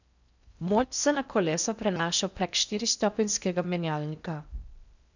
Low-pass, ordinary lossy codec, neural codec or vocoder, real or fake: 7.2 kHz; none; codec, 16 kHz in and 24 kHz out, 0.6 kbps, FocalCodec, streaming, 4096 codes; fake